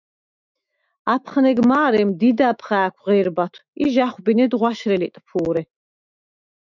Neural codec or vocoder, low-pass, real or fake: autoencoder, 48 kHz, 128 numbers a frame, DAC-VAE, trained on Japanese speech; 7.2 kHz; fake